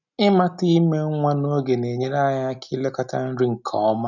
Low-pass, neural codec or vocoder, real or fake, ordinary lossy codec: 7.2 kHz; none; real; none